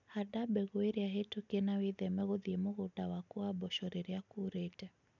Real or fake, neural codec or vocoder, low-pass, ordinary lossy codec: real; none; 7.2 kHz; none